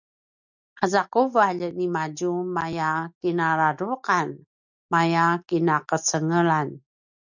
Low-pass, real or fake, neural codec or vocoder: 7.2 kHz; real; none